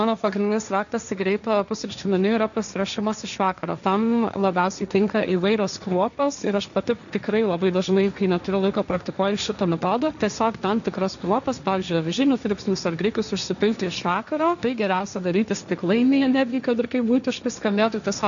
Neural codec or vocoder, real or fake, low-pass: codec, 16 kHz, 1.1 kbps, Voila-Tokenizer; fake; 7.2 kHz